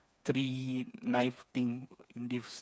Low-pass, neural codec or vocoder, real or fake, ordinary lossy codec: none; codec, 16 kHz, 4 kbps, FreqCodec, smaller model; fake; none